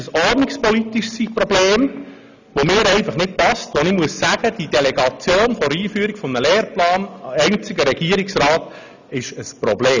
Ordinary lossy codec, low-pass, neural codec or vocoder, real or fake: none; 7.2 kHz; none; real